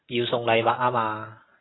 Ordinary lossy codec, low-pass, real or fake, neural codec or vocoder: AAC, 16 kbps; 7.2 kHz; real; none